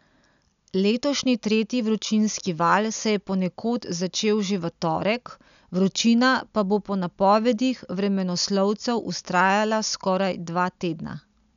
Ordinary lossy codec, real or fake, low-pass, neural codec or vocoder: none; real; 7.2 kHz; none